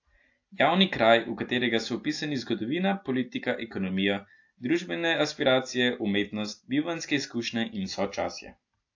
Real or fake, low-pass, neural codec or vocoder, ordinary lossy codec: real; 7.2 kHz; none; AAC, 48 kbps